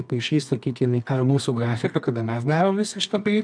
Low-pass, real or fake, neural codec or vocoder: 9.9 kHz; fake; codec, 24 kHz, 0.9 kbps, WavTokenizer, medium music audio release